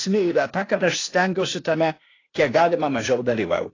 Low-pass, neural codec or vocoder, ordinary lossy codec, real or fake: 7.2 kHz; codec, 16 kHz, 0.8 kbps, ZipCodec; AAC, 32 kbps; fake